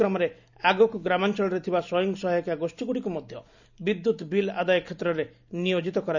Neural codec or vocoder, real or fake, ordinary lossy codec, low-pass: none; real; none; 7.2 kHz